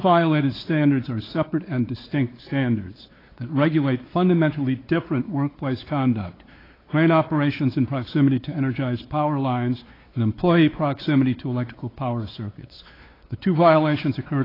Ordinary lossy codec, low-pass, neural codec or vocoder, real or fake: AAC, 24 kbps; 5.4 kHz; codec, 16 kHz, 4 kbps, X-Codec, WavLM features, trained on Multilingual LibriSpeech; fake